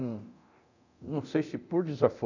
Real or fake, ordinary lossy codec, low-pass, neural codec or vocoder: fake; none; 7.2 kHz; codec, 24 kHz, 0.9 kbps, DualCodec